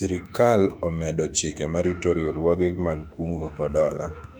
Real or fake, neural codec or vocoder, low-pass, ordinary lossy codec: fake; autoencoder, 48 kHz, 32 numbers a frame, DAC-VAE, trained on Japanese speech; 19.8 kHz; none